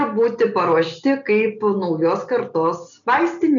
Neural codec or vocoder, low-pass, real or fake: none; 7.2 kHz; real